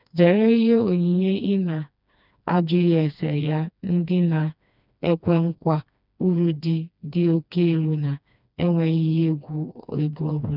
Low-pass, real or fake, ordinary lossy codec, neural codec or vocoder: 5.4 kHz; fake; none; codec, 16 kHz, 2 kbps, FreqCodec, smaller model